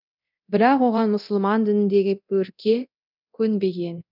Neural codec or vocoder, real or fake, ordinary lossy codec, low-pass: codec, 24 kHz, 0.9 kbps, DualCodec; fake; none; 5.4 kHz